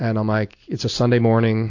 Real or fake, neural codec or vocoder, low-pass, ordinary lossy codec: real; none; 7.2 kHz; AAC, 48 kbps